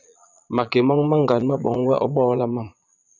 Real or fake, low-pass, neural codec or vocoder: fake; 7.2 kHz; vocoder, 22.05 kHz, 80 mel bands, Vocos